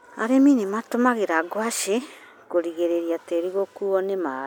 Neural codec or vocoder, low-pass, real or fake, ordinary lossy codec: none; 19.8 kHz; real; none